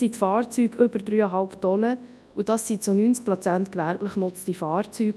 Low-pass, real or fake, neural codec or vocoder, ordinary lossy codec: none; fake; codec, 24 kHz, 0.9 kbps, WavTokenizer, large speech release; none